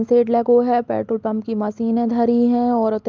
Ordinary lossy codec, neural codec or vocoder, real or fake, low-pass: Opus, 32 kbps; none; real; 7.2 kHz